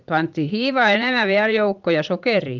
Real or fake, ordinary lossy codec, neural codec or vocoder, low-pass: fake; Opus, 24 kbps; vocoder, 44.1 kHz, 80 mel bands, Vocos; 7.2 kHz